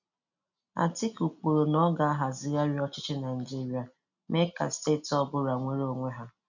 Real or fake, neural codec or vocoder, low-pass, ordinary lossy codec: real; none; 7.2 kHz; none